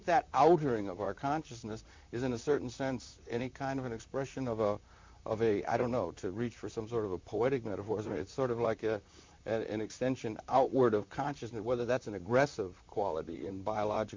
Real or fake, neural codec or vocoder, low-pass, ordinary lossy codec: fake; vocoder, 44.1 kHz, 128 mel bands, Pupu-Vocoder; 7.2 kHz; MP3, 64 kbps